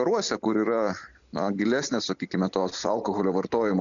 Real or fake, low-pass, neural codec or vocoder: real; 7.2 kHz; none